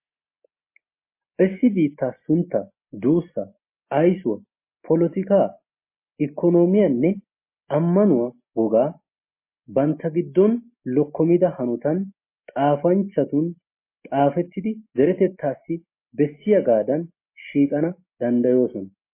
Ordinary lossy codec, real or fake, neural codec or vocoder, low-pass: MP3, 24 kbps; real; none; 3.6 kHz